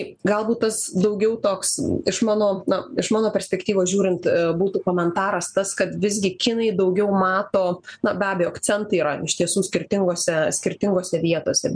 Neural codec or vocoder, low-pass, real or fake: none; 9.9 kHz; real